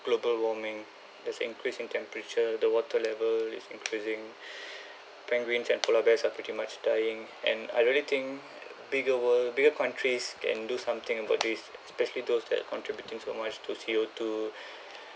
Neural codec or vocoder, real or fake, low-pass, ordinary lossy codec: none; real; none; none